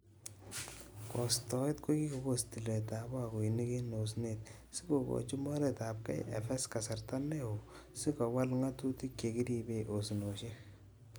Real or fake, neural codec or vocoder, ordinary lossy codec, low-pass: real; none; none; none